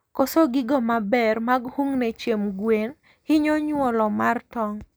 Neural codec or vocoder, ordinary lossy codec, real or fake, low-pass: none; none; real; none